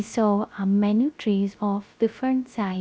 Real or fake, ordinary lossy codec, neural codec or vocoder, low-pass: fake; none; codec, 16 kHz, 0.3 kbps, FocalCodec; none